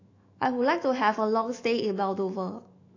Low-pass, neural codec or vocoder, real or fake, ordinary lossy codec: 7.2 kHz; autoencoder, 48 kHz, 128 numbers a frame, DAC-VAE, trained on Japanese speech; fake; AAC, 32 kbps